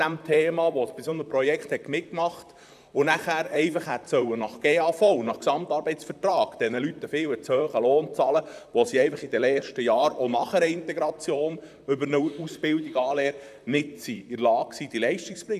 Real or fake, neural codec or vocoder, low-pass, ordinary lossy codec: fake; vocoder, 44.1 kHz, 128 mel bands, Pupu-Vocoder; 14.4 kHz; none